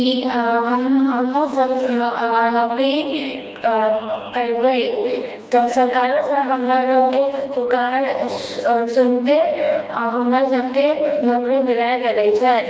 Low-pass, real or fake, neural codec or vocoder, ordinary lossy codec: none; fake; codec, 16 kHz, 1 kbps, FreqCodec, smaller model; none